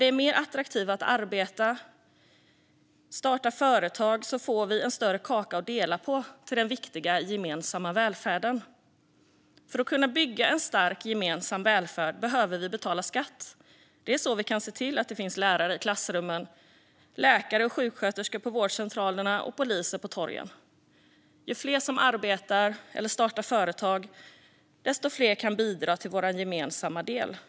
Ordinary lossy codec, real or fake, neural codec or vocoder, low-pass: none; real; none; none